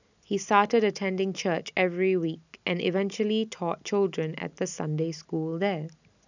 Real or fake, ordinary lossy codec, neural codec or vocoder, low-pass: real; none; none; 7.2 kHz